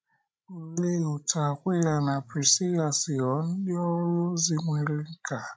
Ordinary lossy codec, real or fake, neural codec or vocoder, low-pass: none; fake; codec, 16 kHz, 16 kbps, FreqCodec, larger model; none